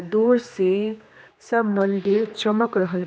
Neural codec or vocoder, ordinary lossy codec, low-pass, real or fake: codec, 16 kHz, 2 kbps, X-Codec, HuBERT features, trained on general audio; none; none; fake